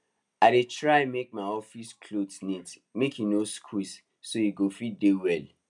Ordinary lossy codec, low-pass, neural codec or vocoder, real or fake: none; 10.8 kHz; none; real